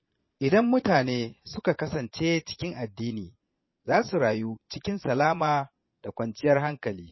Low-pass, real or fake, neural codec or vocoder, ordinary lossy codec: 7.2 kHz; fake; vocoder, 22.05 kHz, 80 mel bands, Vocos; MP3, 24 kbps